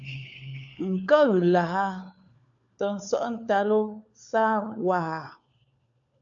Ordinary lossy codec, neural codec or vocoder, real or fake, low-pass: MP3, 96 kbps; codec, 16 kHz, 4 kbps, FunCodec, trained on LibriTTS, 50 frames a second; fake; 7.2 kHz